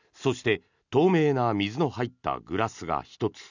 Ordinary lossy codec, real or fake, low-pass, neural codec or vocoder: none; real; 7.2 kHz; none